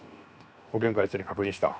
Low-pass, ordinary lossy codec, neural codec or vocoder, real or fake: none; none; codec, 16 kHz, 0.7 kbps, FocalCodec; fake